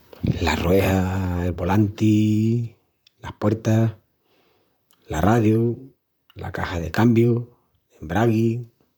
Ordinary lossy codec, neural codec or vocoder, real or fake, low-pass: none; vocoder, 44.1 kHz, 128 mel bands, Pupu-Vocoder; fake; none